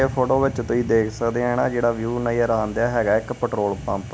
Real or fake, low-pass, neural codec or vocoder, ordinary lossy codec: real; none; none; none